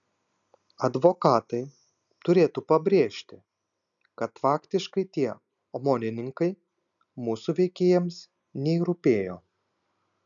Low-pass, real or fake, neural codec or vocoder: 7.2 kHz; real; none